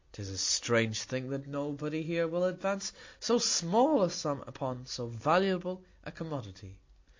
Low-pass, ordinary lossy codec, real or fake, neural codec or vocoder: 7.2 kHz; MP3, 64 kbps; real; none